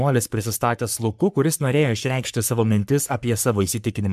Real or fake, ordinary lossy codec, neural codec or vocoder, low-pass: fake; MP3, 96 kbps; codec, 44.1 kHz, 3.4 kbps, Pupu-Codec; 14.4 kHz